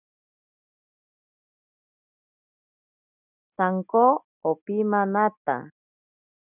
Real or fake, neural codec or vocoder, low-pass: real; none; 3.6 kHz